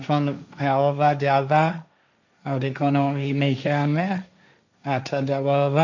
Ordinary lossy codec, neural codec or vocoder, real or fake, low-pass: none; codec, 16 kHz, 1.1 kbps, Voila-Tokenizer; fake; 7.2 kHz